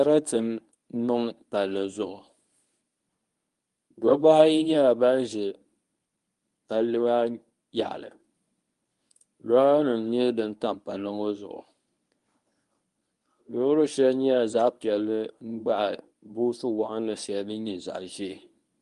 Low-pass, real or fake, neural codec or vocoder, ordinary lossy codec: 10.8 kHz; fake; codec, 24 kHz, 0.9 kbps, WavTokenizer, medium speech release version 1; Opus, 32 kbps